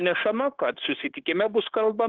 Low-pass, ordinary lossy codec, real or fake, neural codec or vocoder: 7.2 kHz; Opus, 16 kbps; fake; codec, 16 kHz, 0.9 kbps, LongCat-Audio-Codec